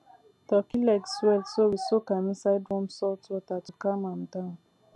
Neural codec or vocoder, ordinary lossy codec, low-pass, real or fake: none; none; none; real